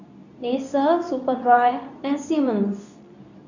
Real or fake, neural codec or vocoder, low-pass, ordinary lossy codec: fake; codec, 24 kHz, 0.9 kbps, WavTokenizer, medium speech release version 2; 7.2 kHz; none